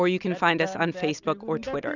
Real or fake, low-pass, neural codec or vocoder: real; 7.2 kHz; none